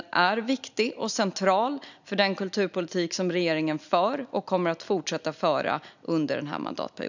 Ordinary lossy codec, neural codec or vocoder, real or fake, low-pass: none; none; real; 7.2 kHz